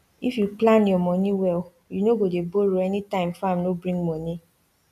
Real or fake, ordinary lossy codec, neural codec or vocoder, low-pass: real; none; none; 14.4 kHz